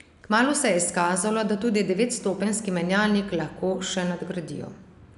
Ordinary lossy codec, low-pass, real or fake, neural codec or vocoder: none; 10.8 kHz; real; none